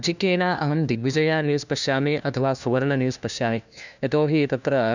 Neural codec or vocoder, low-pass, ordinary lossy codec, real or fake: codec, 16 kHz, 1 kbps, FunCodec, trained on LibriTTS, 50 frames a second; 7.2 kHz; none; fake